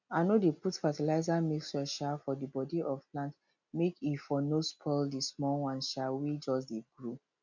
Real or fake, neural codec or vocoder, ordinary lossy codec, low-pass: real; none; none; 7.2 kHz